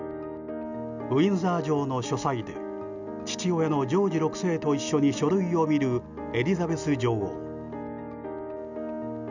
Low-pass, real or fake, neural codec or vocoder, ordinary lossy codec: 7.2 kHz; real; none; none